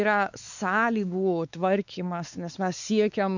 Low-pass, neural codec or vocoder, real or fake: 7.2 kHz; codec, 16 kHz, 4 kbps, X-Codec, WavLM features, trained on Multilingual LibriSpeech; fake